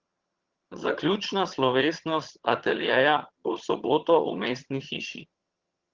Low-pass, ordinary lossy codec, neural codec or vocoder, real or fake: 7.2 kHz; Opus, 16 kbps; vocoder, 22.05 kHz, 80 mel bands, HiFi-GAN; fake